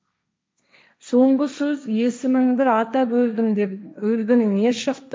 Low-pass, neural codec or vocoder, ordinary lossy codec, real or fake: none; codec, 16 kHz, 1.1 kbps, Voila-Tokenizer; none; fake